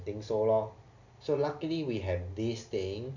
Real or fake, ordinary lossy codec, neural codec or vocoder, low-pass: real; none; none; 7.2 kHz